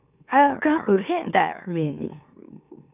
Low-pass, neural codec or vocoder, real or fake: 3.6 kHz; autoencoder, 44.1 kHz, a latent of 192 numbers a frame, MeloTTS; fake